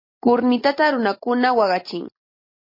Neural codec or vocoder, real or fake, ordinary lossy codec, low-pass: none; real; MP3, 24 kbps; 5.4 kHz